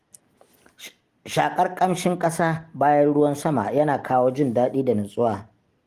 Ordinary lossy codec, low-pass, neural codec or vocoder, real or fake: Opus, 24 kbps; 14.4 kHz; none; real